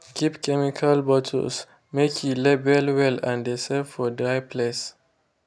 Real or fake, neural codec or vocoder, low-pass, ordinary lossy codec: real; none; none; none